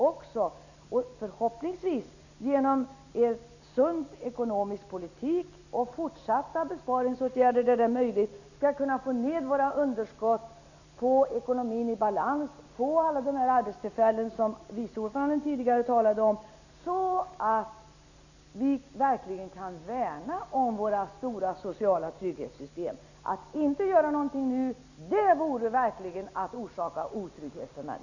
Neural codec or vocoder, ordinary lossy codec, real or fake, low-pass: none; none; real; 7.2 kHz